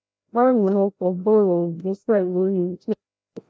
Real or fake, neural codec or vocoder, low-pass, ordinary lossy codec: fake; codec, 16 kHz, 0.5 kbps, FreqCodec, larger model; none; none